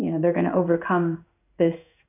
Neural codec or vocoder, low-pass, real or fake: codec, 16 kHz in and 24 kHz out, 1 kbps, XY-Tokenizer; 3.6 kHz; fake